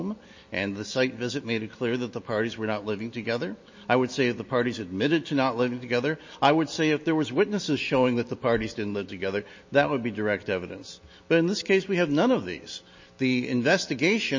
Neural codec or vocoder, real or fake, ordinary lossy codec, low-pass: none; real; MP3, 32 kbps; 7.2 kHz